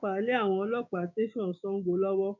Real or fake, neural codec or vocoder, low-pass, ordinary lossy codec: fake; codec, 16 kHz, 6 kbps, DAC; 7.2 kHz; none